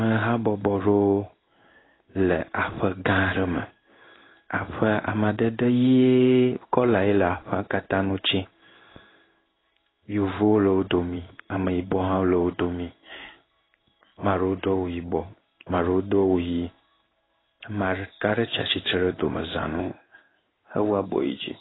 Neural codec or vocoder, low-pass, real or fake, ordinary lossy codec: codec, 16 kHz in and 24 kHz out, 1 kbps, XY-Tokenizer; 7.2 kHz; fake; AAC, 16 kbps